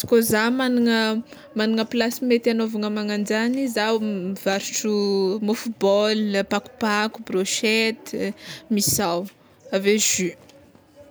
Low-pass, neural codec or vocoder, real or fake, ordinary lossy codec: none; none; real; none